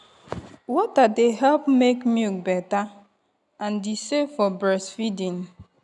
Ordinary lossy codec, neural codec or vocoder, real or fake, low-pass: none; none; real; 10.8 kHz